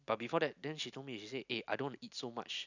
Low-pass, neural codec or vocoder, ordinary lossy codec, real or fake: 7.2 kHz; none; none; real